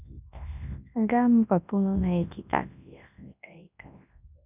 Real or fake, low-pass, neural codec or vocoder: fake; 3.6 kHz; codec, 24 kHz, 0.9 kbps, WavTokenizer, large speech release